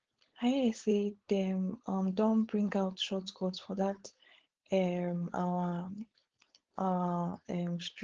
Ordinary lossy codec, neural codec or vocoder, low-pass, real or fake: Opus, 16 kbps; codec, 16 kHz, 4.8 kbps, FACodec; 7.2 kHz; fake